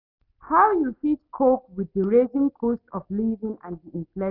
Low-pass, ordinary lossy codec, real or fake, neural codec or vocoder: 5.4 kHz; none; real; none